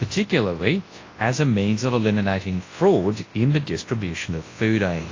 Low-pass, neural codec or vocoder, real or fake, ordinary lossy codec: 7.2 kHz; codec, 24 kHz, 0.9 kbps, WavTokenizer, large speech release; fake; AAC, 32 kbps